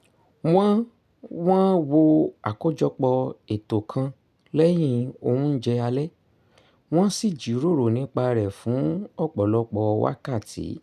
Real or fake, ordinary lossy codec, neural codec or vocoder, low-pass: real; none; none; 14.4 kHz